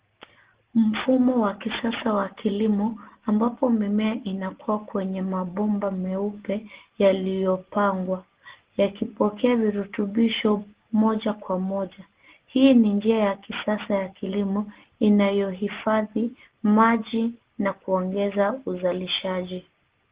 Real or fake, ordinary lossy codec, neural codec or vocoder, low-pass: real; Opus, 16 kbps; none; 3.6 kHz